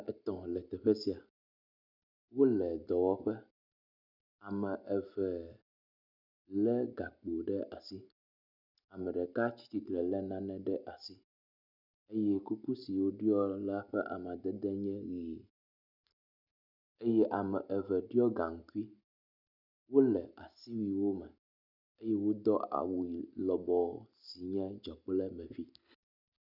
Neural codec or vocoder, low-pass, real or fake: none; 5.4 kHz; real